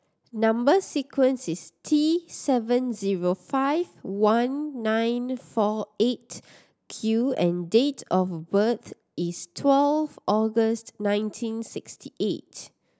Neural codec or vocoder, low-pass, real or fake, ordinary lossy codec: none; none; real; none